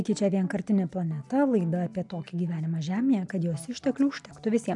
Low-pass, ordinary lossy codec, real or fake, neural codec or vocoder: 10.8 kHz; MP3, 96 kbps; real; none